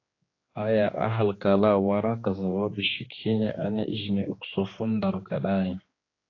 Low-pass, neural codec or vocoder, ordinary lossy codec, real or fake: 7.2 kHz; codec, 16 kHz, 2 kbps, X-Codec, HuBERT features, trained on general audio; AAC, 32 kbps; fake